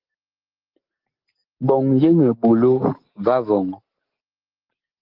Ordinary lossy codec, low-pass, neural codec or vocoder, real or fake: Opus, 16 kbps; 5.4 kHz; none; real